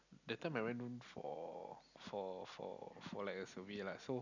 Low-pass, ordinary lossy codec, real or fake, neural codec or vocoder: 7.2 kHz; none; real; none